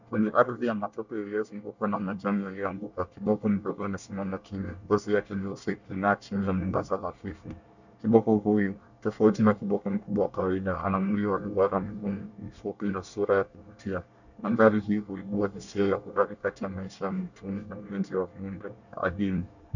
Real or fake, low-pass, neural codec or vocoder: fake; 7.2 kHz; codec, 24 kHz, 1 kbps, SNAC